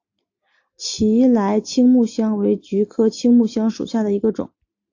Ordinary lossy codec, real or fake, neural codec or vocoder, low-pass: AAC, 48 kbps; real; none; 7.2 kHz